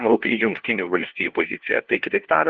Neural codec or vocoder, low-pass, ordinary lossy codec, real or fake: codec, 16 kHz, 1 kbps, FunCodec, trained on LibriTTS, 50 frames a second; 7.2 kHz; Opus, 16 kbps; fake